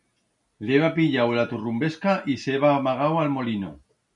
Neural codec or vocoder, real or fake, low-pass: none; real; 10.8 kHz